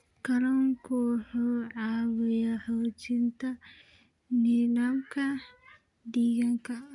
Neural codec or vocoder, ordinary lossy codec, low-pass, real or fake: none; none; 10.8 kHz; real